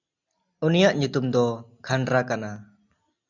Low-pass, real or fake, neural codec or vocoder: 7.2 kHz; real; none